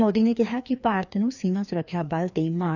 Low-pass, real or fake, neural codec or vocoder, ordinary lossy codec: 7.2 kHz; fake; codec, 16 kHz, 2 kbps, FreqCodec, larger model; none